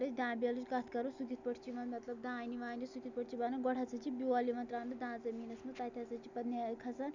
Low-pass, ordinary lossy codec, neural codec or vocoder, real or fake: 7.2 kHz; none; none; real